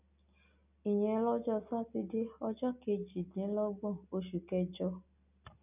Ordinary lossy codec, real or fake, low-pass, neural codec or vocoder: none; real; 3.6 kHz; none